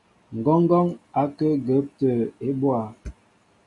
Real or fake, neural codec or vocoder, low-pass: real; none; 10.8 kHz